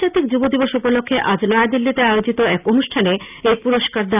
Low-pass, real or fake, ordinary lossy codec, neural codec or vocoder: 3.6 kHz; real; none; none